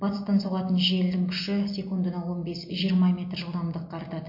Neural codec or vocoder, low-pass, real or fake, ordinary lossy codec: none; 5.4 kHz; real; MP3, 32 kbps